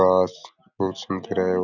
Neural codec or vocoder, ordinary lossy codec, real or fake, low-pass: none; none; real; 7.2 kHz